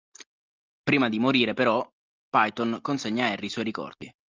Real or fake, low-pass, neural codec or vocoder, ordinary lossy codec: real; 7.2 kHz; none; Opus, 24 kbps